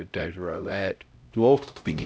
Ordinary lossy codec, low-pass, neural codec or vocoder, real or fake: none; none; codec, 16 kHz, 0.5 kbps, X-Codec, HuBERT features, trained on LibriSpeech; fake